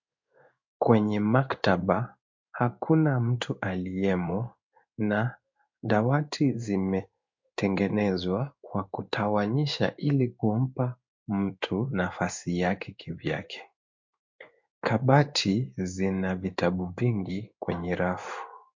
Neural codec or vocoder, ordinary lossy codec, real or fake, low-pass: codec, 16 kHz in and 24 kHz out, 1 kbps, XY-Tokenizer; MP3, 48 kbps; fake; 7.2 kHz